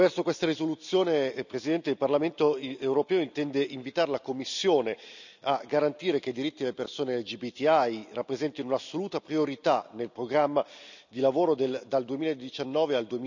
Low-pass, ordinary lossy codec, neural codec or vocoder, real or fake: 7.2 kHz; none; none; real